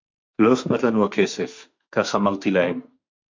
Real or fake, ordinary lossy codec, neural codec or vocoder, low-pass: fake; MP3, 48 kbps; autoencoder, 48 kHz, 32 numbers a frame, DAC-VAE, trained on Japanese speech; 7.2 kHz